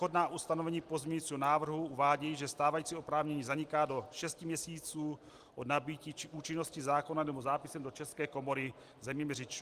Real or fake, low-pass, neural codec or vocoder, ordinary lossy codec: real; 14.4 kHz; none; Opus, 24 kbps